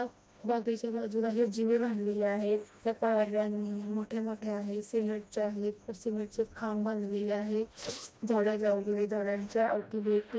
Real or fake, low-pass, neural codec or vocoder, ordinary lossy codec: fake; none; codec, 16 kHz, 1 kbps, FreqCodec, smaller model; none